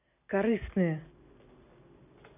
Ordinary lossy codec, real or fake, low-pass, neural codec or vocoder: none; real; 3.6 kHz; none